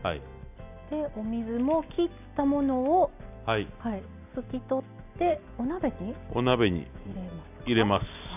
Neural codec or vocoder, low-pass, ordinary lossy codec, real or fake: none; 3.6 kHz; none; real